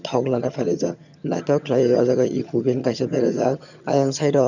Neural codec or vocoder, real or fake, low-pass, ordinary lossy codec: vocoder, 22.05 kHz, 80 mel bands, HiFi-GAN; fake; 7.2 kHz; none